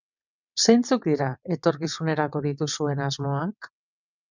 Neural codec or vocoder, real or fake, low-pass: vocoder, 22.05 kHz, 80 mel bands, WaveNeXt; fake; 7.2 kHz